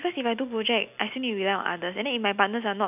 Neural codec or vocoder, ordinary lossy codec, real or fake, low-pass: none; none; real; 3.6 kHz